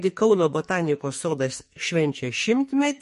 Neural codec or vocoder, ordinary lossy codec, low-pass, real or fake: codec, 44.1 kHz, 2.6 kbps, SNAC; MP3, 48 kbps; 14.4 kHz; fake